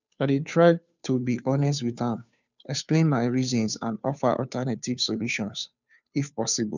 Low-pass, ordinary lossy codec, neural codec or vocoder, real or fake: 7.2 kHz; none; codec, 16 kHz, 2 kbps, FunCodec, trained on Chinese and English, 25 frames a second; fake